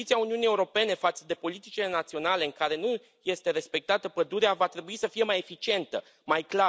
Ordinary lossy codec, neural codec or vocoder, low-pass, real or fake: none; none; none; real